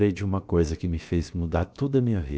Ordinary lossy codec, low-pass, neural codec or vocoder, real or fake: none; none; codec, 16 kHz, about 1 kbps, DyCAST, with the encoder's durations; fake